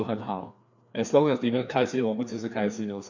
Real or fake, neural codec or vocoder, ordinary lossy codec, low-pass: fake; codec, 16 kHz, 2 kbps, FreqCodec, larger model; none; 7.2 kHz